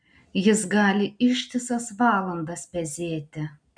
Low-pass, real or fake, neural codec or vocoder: 9.9 kHz; real; none